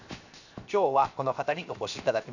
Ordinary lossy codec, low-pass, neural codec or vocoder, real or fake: none; 7.2 kHz; codec, 16 kHz, 0.7 kbps, FocalCodec; fake